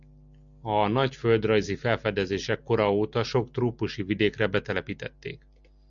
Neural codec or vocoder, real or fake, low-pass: none; real; 7.2 kHz